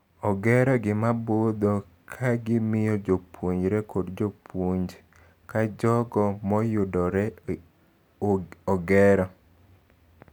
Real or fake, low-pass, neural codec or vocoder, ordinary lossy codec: real; none; none; none